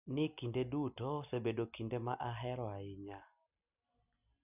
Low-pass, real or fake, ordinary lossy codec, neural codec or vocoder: 3.6 kHz; real; none; none